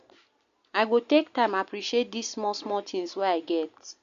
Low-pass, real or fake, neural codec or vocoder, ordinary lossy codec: 7.2 kHz; real; none; Opus, 64 kbps